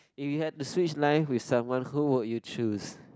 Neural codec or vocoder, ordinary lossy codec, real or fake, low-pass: none; none; real; none